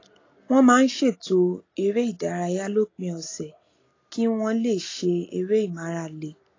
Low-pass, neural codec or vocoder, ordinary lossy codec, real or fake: 7.2 kHz; none; AAC, 32 kbps; real